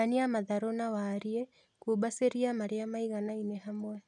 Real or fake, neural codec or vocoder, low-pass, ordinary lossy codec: real; none; 10.8 kHz; none